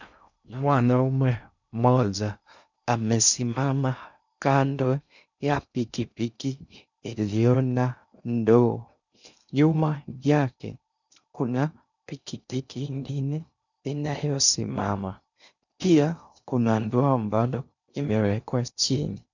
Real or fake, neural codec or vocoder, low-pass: fake; codec, 16 kHz in and 24 kHz out, 0.6 kbps, FocalCodec, streaming, 2048 codes; 7.2 kHz